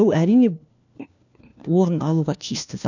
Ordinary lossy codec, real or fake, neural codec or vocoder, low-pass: none; fake; codec, 16 kHz, 1 kbps, FunCodec, trained on LibriTTS, 50 frames a second; 7.2 kHz